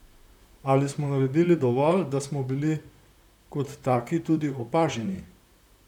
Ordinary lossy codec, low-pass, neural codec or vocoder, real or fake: none; 19.8 kHz; vocoder, 44.1 kHz, 128 mel bands, Pupu-Vocoder; fake